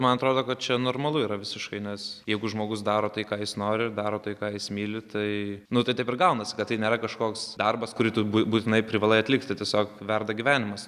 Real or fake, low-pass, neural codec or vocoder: real; 14.4 kHz; none